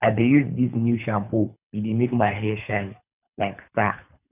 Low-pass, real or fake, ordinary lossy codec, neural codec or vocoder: 3.6 kHz; fake; none; codec, 24 kHz, 3 kbps, HILCodec